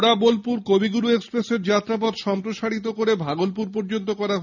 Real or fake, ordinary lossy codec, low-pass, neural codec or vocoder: real; none; 7.2 kHz; none